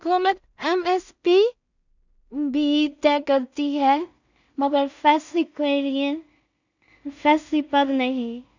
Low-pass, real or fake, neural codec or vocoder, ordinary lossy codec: 7.2 kHz; fake; codec, 16 kHz in and 24 kHz out, 0.4 kbps, LongCat-Audio-Codec, two codebook decoder; none